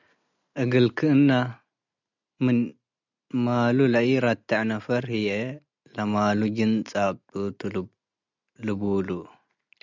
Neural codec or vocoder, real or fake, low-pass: none; real; 7.2 kHz